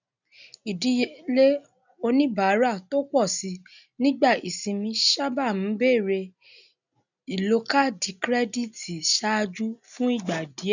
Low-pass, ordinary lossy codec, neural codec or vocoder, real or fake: 7.2 kHz; none; none; real